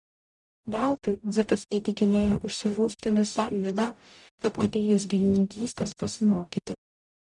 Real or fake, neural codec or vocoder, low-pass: fake; codec, 44.1 kHz, 0.9 kbps, DAC; 10.8 kHz